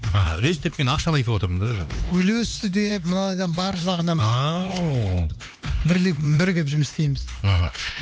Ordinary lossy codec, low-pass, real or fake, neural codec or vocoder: none; none; fake; codec, 16 kHz, 2 kbps, X-Codec, HuBERT features, trained on LibriSpeech